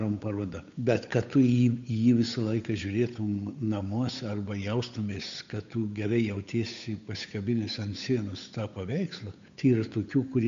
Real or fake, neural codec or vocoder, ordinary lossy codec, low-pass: fake; codec, 16 kHz, 8 kbps, FunCodec, trained on Chinese and English, 25 frames a second; MP3, 64 kbps; 7.2 kHz